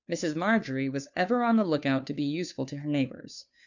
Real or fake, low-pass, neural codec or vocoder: fake; 7.2 kHz; codec, 16 kHz, 2 kbps, FunCodec, trained on Chinese and English, 25 frames a second